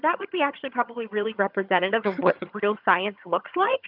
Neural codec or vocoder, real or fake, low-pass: vocoder, 22.05 kHz, 80 mel bands, HiFi-GAN; fake; 5.4 kHz